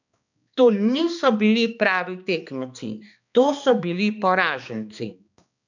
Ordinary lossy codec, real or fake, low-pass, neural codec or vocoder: none; fake; 7.2 kHz; codec, 16 kHz, 2 kbps, X-Codec, HuBERT features, trained on balanced general audio